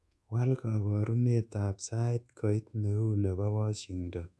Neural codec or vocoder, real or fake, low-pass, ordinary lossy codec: codec, 24 kHz, 1.2 kbps, DualCodec; fake; none; none